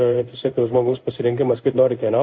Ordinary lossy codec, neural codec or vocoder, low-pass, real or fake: AAC, 48 kbps; codec, 16 kHz in and 24 kHz out, 1 kbps, XY-Tokenizer; 7.2 kHz; fake